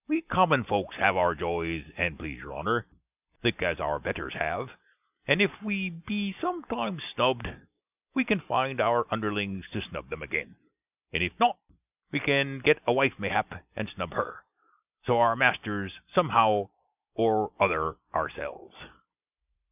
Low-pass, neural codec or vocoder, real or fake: 3.6 kHz; none; real